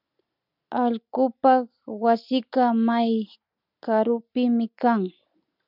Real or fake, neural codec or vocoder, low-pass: real; none; 5.4 kHz